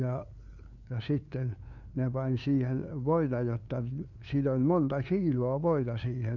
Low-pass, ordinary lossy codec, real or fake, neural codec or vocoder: 7.2 kHz; none; fake; codec, 16 kHz, 2 kbps, FunCodec, trained on LibriTTS, 25 frames a second